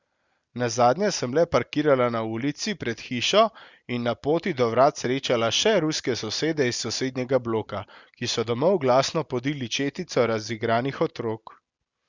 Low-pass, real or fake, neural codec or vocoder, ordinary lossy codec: 7.2 kHz; real; none; Opus, 64 kbps